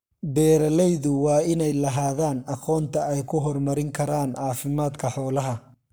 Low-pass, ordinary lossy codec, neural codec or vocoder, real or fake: none; none; codec, 44.1 kHz, 7.8 kbps, Pupu-Codec; fake